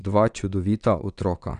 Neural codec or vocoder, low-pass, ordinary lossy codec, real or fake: vocoder, 22.05 kHz, 80 mel bands, WaveNeXt; 9.9 kHz; none; fake